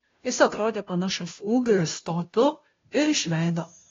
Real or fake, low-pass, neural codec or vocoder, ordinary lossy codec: fake; 7.2 kHz; codec, 16 kHz, 0.5 kbps, FunCodec, trained on Chinese and English, 25 frames a second; AAC, 32 kbps